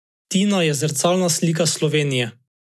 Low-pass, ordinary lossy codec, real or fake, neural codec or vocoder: none; none; real; none